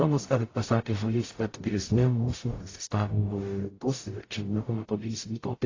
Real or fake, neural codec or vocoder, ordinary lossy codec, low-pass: fake; codec, 44.1 kHz, 0.9 kbps, DAC; AAC, 32 kbps; 7.2 kHz